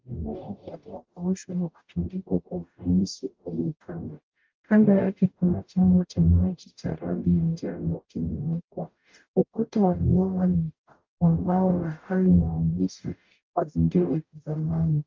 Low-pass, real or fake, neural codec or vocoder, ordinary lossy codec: 7.2 kHz; fake; codec, 44.1 kHz, 0.9 kbps, DAC; Opus, 24 kbps